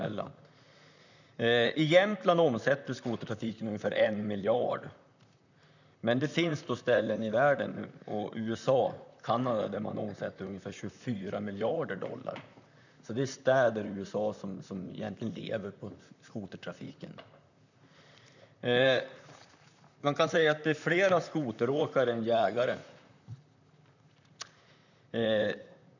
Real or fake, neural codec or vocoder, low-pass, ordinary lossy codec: fake; vocoder, 44.1 kHz, 128 mel bands, Pupu-Vocoder; 7.2 kHz; none